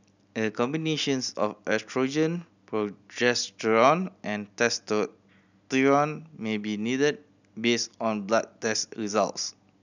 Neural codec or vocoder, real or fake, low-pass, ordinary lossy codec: none; real; 7.2 kHz; none